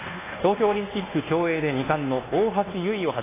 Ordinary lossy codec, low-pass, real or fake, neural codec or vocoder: AAC, 16 kbps; 3.6 kHz; fake; codec, 24 kHz, 1.2 kbps, DualCodec